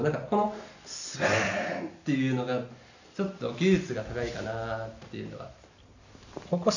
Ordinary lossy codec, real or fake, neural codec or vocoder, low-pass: none; real; none; 7.2 kHz